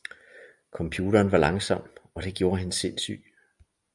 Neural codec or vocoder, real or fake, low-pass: none; real; 10.8 kHz